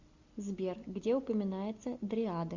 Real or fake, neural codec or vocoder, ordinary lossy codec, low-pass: real; none; Opus, 64 kbps; 7.2 kHz